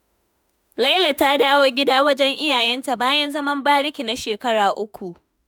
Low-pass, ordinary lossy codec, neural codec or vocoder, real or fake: none; none; autoencoder, 48 kHz, 32 numbers a frame, DAC-VAE, trained on Japanese speech; fake